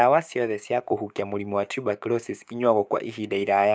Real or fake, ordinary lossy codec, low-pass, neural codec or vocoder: fake; none; none; codec, 16 kHz, 6 kbps, DAC